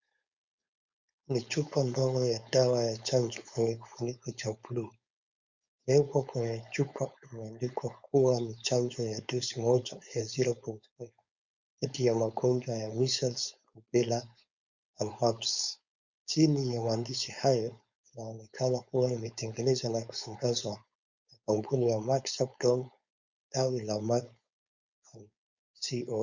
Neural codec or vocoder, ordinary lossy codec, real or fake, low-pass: codec, 16 kHz, 4.8 kbps, FACodec; Opus, 64 kbps; fake; 7.2 kHz